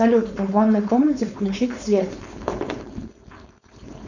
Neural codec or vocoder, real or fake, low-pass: codec, 16 kHz, 4.8 kbps, FACodec; fake; 7.2 kHz